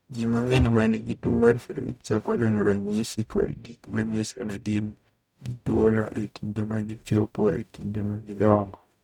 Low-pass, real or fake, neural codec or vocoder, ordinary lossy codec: 19.8 kHz; fake; codec, 44.1 kHz, 0.9 kbps, DAC; none